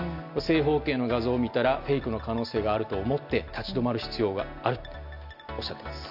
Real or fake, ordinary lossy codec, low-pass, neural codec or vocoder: real; none; 5.4 kHz; none